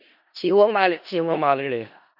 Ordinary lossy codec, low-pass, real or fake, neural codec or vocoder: none; 5.4 kHz; fake; codec, 16 kHz in and 24 kHz out, 0.4 kbps, LongCat-Audio-Codec, four codebook decoder